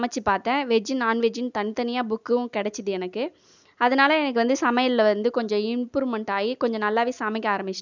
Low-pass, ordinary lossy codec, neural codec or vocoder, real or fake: 7.2 kHz; none; none; real